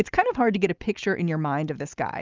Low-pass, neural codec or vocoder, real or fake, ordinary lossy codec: 7.2 kHz; none; real; Opus, 24 kbps